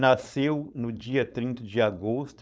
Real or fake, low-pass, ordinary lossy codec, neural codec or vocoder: fake; none; none; codec, 16 kHz, 4.8 kbps, FACodec